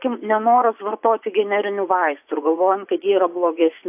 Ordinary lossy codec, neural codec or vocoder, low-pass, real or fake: AAC, 32 kbps; vocoder, 24 kHz, 100 mel bands, Vocos; 3.6 kHz; fake